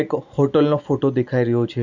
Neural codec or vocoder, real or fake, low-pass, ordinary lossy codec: none; real; 7.2 kHz; none